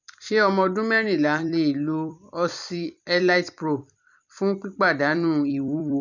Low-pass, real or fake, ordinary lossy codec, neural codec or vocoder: 7.2 kHz; fake; none; vocoder, 44.1 kHz, 128 mel bands every 256 samples, BigVGAN v2